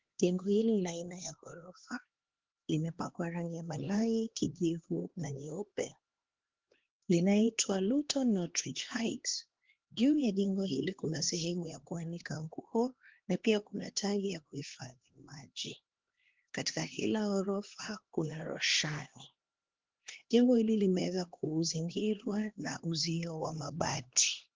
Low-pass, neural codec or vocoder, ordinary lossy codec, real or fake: 7.2 kHz; codec, 16 kHz, 2 kbps, X-Codec, HuBERT features, trained on LibriSpeech; Opus, 16 kbps; fake